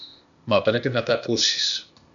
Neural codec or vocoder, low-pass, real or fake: codec, 16 kHz, 0.8 kbps, ZipCodec; 7.2 kHz; fake